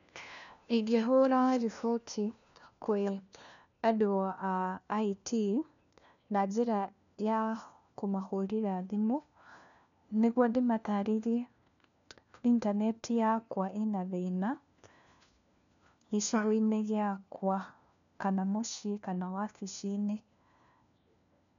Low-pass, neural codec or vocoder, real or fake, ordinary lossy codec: 7.2 kHz; codec, 16 kHz, 1 kbps, FunCodec, trained on LibriTTS, 50 frames a second; fake; none